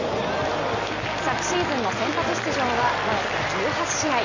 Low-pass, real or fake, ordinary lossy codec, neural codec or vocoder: 7.2 kHz; real; Opus, 64 kbps; none